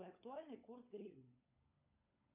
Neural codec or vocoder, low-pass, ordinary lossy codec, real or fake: codec, 16 kHz, 16 kbps, FunCodec, trained on LibriTTS, 50 frames a second; 3.6 kHz; AAC, 32 kbps; fake